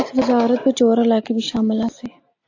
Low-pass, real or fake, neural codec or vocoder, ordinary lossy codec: 7.2 kHz; real; none; AAC, 48 kbps